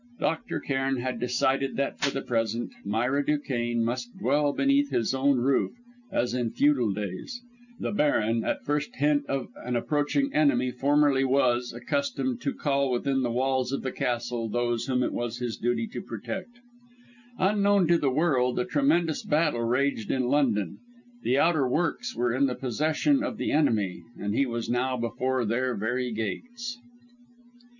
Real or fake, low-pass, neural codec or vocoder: real; 7.2 kHz; none